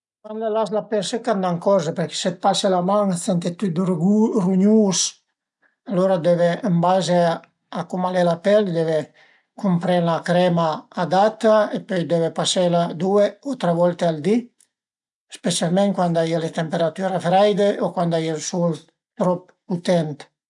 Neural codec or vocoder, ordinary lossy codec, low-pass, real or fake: none; none; 10.8 kHz; real